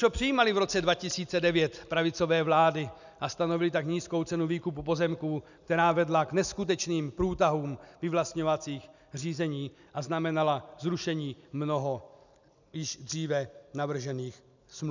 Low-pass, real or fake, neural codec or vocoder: 7.2 kHz; real; none